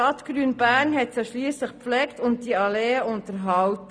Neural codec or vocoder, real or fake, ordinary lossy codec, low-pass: none; real; none; none